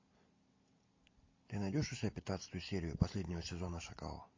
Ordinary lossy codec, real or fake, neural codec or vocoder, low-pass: MP3, 32 kbps; real; none; 7.2 kHz